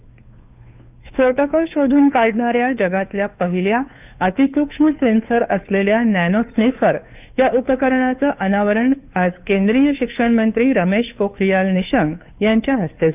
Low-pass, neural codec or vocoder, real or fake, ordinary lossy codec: 3.6 kHz; codec, 16 kHz, 2 kbps, FunCodec, trained on Chinese and English, 25 frames a second; fake; none